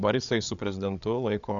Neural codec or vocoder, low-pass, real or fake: codec, 16 kHz, 8 kbps, FreqCodec, larger model; 7.2 kHz; fake